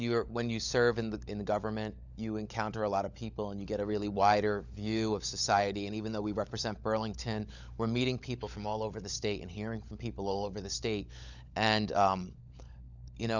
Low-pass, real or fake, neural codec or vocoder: 7.2 kHz; fake; codec, 16 kHz, 16 kbps, FunCodec, trained on LibriTTS, 50 frames a second